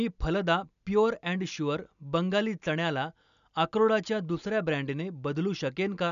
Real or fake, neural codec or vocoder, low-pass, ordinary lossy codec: real; none; 7.2 kHz; none